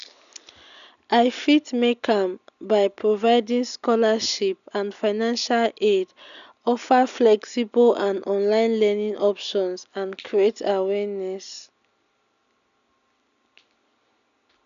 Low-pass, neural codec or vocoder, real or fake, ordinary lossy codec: 7.2 kHz; none; real; none